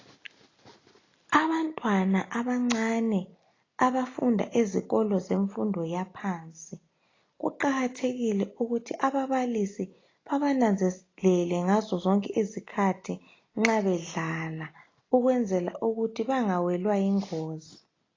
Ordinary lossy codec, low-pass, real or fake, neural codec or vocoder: AAC, 32 kbps; 7.2 kHz; real; none